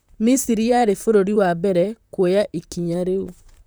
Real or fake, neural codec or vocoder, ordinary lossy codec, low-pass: fake; vocoder, 44.1 kHz, 128 mel bands, Pupu-Vocoder; none; none